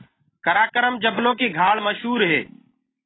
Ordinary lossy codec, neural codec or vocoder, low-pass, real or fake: AAC, 16 kbps; none; 7.2 kHz; real